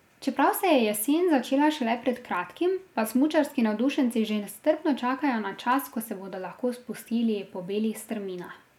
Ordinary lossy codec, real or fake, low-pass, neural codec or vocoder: none; real; 19.8 kHz; none